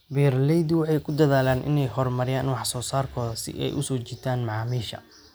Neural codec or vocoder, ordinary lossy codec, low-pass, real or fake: none; none; none; real